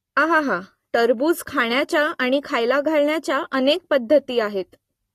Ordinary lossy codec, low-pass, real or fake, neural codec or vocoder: AAC, 48 kbps; 14.4 kHz; real; none